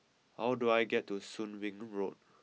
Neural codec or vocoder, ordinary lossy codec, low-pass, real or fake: none; none; none; real